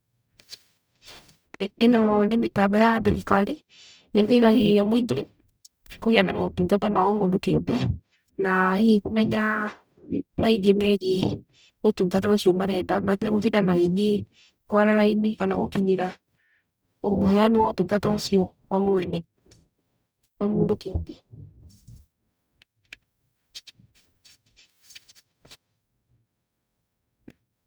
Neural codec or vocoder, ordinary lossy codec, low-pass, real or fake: codec, 44.1 kHz, 0.9 kbps, DAC; none; none; fake